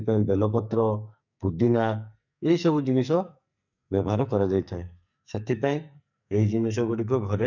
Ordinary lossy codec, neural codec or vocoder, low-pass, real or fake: none; codec, 44.1 kHz, 2.6 kbps, SNAC; 7.2 kHz; fake